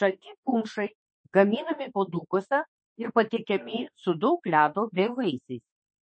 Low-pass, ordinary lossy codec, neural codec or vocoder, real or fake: 9.9 kHz; MP3, 32 kbps; autoencoder, 48 kHz, 32 numbers a frame, DAC-VAE, trained on Japanese speech; fake